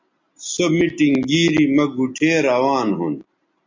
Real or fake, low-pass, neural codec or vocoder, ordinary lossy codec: real; 7.2 kHz; none; MP3, 48 kbps